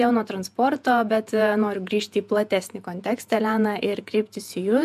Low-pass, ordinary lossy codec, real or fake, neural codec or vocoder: 14.4 kHz; MP3, 96 kbps; fake; vocoder, 48 kHz, 128 mel bands, Vocos